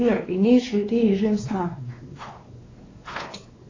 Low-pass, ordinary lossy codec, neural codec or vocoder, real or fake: 7.2 kHz; AAC, 32 kbps; codec, 16 kHz, 2 kbps, X-Codec, WavLM features, trained on Multilingual LibriSpeech; fake